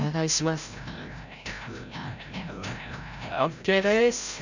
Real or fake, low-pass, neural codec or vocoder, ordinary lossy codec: fake; 7.2 kHz; codec, 16 kHz, 0.5 kbps, FreqCodec, larger model; none